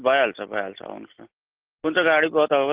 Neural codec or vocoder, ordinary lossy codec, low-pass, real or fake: none; Opus, 16 kbps; 3.6 kHz; real